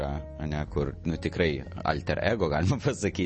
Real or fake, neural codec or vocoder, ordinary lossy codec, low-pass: real; none; MP3, 32 kbps; 10.8 kHz